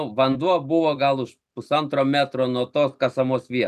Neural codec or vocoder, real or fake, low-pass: none; real; 14.4 kHz